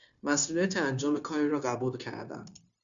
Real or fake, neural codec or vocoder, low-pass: fake; codec, 16 kHz, 0.9 kbps, LongCat-Audio-Codec; 7.2 kHz